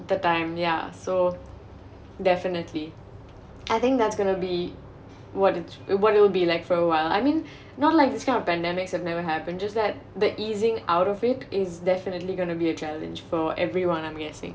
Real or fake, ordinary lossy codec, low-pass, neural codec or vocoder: real; none; none; none